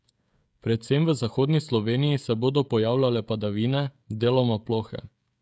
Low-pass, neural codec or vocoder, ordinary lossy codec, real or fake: none; codec, 16 kHz, 16 kbps, FreqCodec, smaller model; none; fake